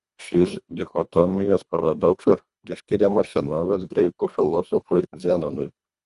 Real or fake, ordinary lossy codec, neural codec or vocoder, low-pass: fake; Opus, 64 kbps; codec, 24 kHz, 1.5 kbps, HILCodec; 10.8 kHz